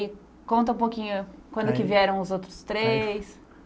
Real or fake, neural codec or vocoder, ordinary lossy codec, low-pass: real; none; none; none